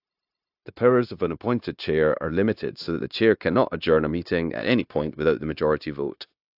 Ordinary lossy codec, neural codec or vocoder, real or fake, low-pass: AAC, 48 kbps; codec, 16 kHz, 0.9 kbps, LongCat-Audio-Codec; fake; 5.4 kHz